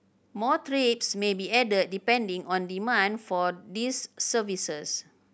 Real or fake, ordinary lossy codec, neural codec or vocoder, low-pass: real; none; none; none